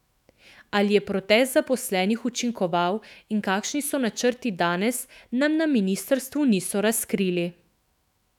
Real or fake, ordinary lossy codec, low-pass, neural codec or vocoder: fake; none; 19.8 kHz; autoencoder, 48 kHz, 128 numbers a frame, DAC-VAE, trained on Japanese speech